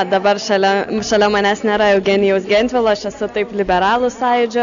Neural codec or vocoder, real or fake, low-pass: none; real; 7.2 kHz